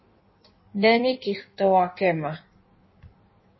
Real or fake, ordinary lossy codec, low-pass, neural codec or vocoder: fake; MP3, 24 kbps; 7.2 kHz; codec, 16 kHz in and 24 kHz out, 1.1 kbps, FireRedTTS-2 codec